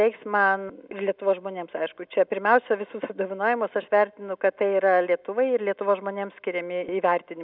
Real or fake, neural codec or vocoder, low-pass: real; none; 5.4 kHz